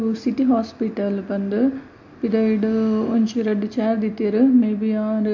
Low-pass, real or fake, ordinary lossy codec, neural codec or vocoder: 7.2 kHz; real; MP3, 48 kbps; none